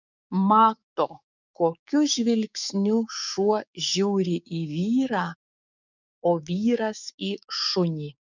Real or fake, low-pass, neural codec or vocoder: fake; 7.2 kHz; codec, 16 kHz, 6 kbps, DAC